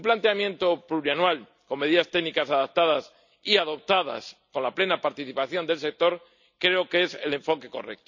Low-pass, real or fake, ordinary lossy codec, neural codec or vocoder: 7.2 kHz; real; none; none